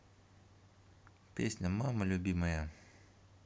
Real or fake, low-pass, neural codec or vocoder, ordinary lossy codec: real; none; none; none